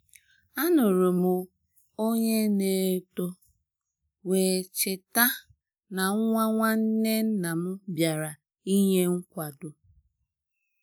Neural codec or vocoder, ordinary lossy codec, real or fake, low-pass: none; none; real; none